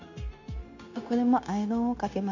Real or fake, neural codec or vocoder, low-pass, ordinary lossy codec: fake; codec, 16 kHz, 0.9 kbps, LongCat-Audio-Codec; 7.2 kHz; none